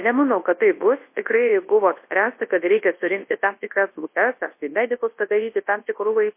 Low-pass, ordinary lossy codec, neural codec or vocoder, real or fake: 3.6 kHz; MP3, 24 kbps; codec, 24 kHz, 0.9 kbps, WavTokenizer, large speech release; fake